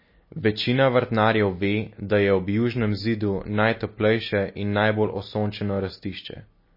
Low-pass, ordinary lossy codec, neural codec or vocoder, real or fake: 5.4 kHz; MP3, 24 kbps; none; real